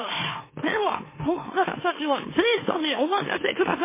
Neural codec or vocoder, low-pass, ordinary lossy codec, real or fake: autoencoder, 44.1 kHz, a latent of 192 numbers a frame, MeloTTS; 3.6 kHz; MP3, 16 kbps; fake